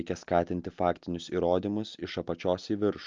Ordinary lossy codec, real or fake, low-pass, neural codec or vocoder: Opus, 24 kbps; real; 7.2 kHz; none